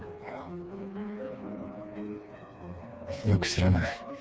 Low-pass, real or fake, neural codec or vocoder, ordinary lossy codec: none; fake; codec, 16 kHz, 2 kbps, FreqCodec, smaller model; none